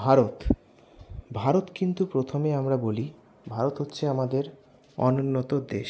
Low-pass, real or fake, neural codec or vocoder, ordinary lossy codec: none; real; none; none